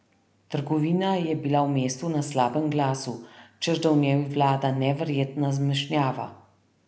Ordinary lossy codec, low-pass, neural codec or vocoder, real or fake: none; none; none; real